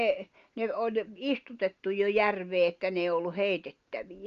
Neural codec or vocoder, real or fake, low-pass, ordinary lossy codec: none; real; 7.2 kHz; Opus, 32 kbps